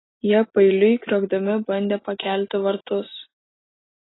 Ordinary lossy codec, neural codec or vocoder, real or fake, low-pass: AAC, 16 kbps; none; real; 7.2 kHz